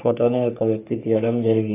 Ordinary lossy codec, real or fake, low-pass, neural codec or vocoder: AAC, 24 kbps; fake; 3.6 kHz; codec, 44.1 kHz, 3.4 kbps, Pupu-Codec